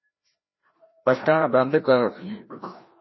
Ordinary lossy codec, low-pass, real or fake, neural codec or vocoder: MP3, 24 kbps; 7.2 kHz; fake; codec, 16 kHz, 1 kbps, FreqCodec, larger model